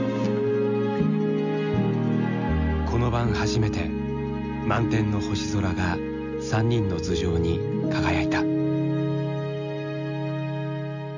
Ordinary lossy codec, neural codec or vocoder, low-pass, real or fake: none; none; 7.2 kHz; real